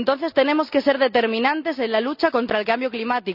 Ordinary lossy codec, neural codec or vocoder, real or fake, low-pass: none; none; real; 5.4 kHz